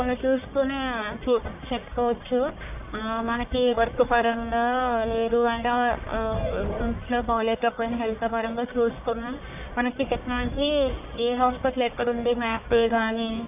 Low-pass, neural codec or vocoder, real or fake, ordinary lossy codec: 3.6 kHz; codec, 44.1 kHz, 1.7 kbps, Pupu-Codec; fake; none